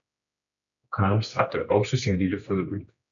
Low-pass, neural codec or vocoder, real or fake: 7.2 kHz; codec, 16 kHz, 2 kbps, X-Codec, HuBERT features, trained on general audio; fake